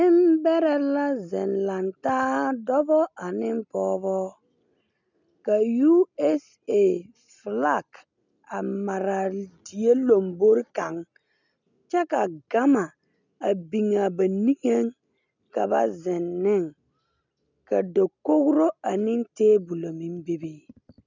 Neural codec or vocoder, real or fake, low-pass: none; real; 7.2 kHz